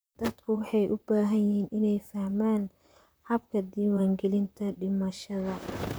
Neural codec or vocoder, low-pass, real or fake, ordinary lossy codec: vocoder, 44.1 kHz, 128 mel bands, Pupu-Vocoder; none; fake; none